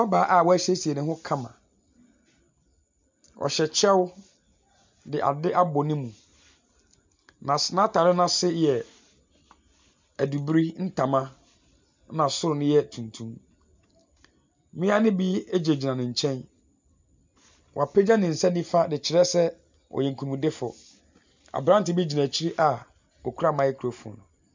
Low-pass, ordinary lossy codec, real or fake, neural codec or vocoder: 7.2 kHz; MP3, 64 kbps; real; none